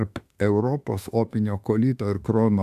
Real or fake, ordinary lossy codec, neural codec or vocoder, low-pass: fake; AAC, 96 kbps; autoencoder, 48 kHz, 32 numbers a frame, DAC-VAE, trained on Japanese speech; 14.4 kHz